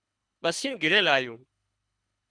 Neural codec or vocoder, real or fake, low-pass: codec, 24 kHz, 3 kbps, HILCodec; fake; 9.9 kHz